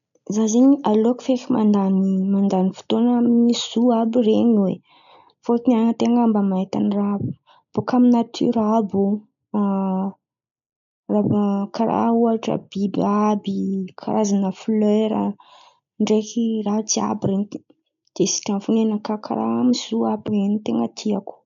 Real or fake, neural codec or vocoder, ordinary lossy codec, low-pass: real; none; none; 7.2 kHz